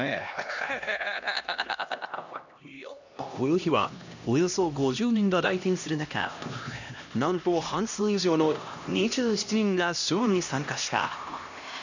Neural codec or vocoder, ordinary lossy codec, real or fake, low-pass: codec, 16 kHz, 1 kbps, X-Codec, HuBERT features, trained on LibriSpeech; none; fake; 7.2 kHz